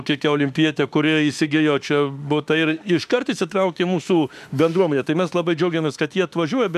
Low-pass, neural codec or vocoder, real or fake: 14.4 kHz; autoencoder, 48 kHz, 32 numbers a frame, DAC-VAE, trained on Japanese speech; fake